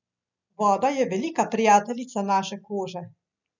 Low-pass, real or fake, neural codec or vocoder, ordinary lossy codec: 7.2 kHz; real; none; none